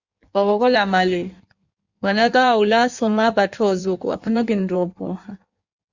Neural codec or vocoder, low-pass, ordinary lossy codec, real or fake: codec, 16 kHz in and 24 kHz out, 1.1 kbps, FireRedTTS-2 codec; 7.2 kHz; Opus, 64 kbps; fake